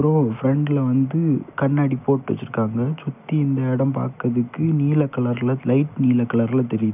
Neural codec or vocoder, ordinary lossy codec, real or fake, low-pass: none; none; real; 3.6 kHz